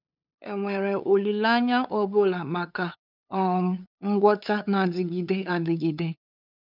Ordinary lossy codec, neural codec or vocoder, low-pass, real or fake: none; codec, 16 kHz, 8 kbps, FunCodec, trained on LibriTTS, 25 frames a second; 5.4 kHz; fake